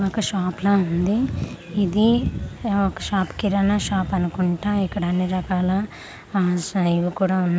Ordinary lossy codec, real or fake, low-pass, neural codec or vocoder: none; real; none; none